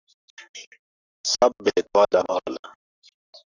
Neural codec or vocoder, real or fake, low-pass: codec, 44.1 kHz, 7.8 kbps, Pupu-Codec; fake; 7.2 kHz